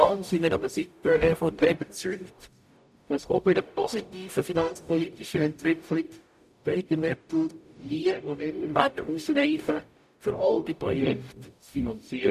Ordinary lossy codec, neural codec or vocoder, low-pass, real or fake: none; codec, 44.1 kHz, 0.9 kbps, DAC; 14.4 kHz; fake